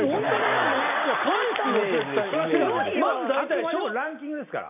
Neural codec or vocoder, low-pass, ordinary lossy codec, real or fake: none; 3.6 kHz; none; real